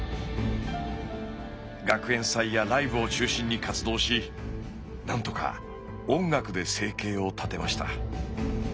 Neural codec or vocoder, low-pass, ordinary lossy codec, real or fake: none; none; none; real